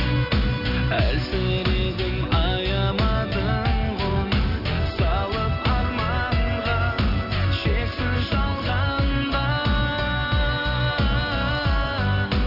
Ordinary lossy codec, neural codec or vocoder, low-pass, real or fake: none; none; 5.4 kHz; real